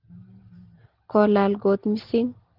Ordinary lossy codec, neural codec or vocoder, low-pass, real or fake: Opus, 16 kbps; none; 5.4 kHz; real